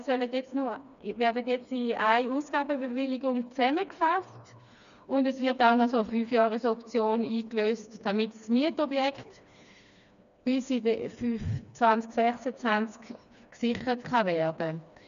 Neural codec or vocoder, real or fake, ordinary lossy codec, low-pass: codec, 16 kHz, 2 kbps, FreqCodec, smaller model; fake; none; 7.2 kHz